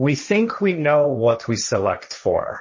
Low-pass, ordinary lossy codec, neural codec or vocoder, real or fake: 7.2 kHz; MP3, 32 kbps; codec, 16 kHz, 1.1 kbps, Voila-Tokenizer; fake